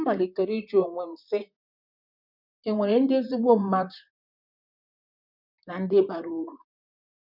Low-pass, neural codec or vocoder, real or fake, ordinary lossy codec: 5.4 kHz; vocoder, 44.1 kHz, 128 mel bands, Pupu-Vocoder; fake; none